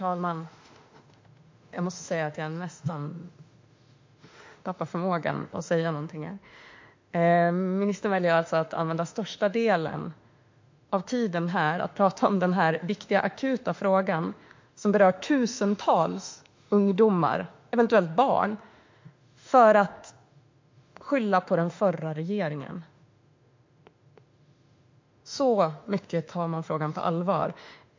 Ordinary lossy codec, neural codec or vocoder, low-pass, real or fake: MP3, 48 kbps; autoencoder, 48 kHz, 32 numbers a frame, DAC-VAE, trained on Japanese speech; 7.2 kHz; fake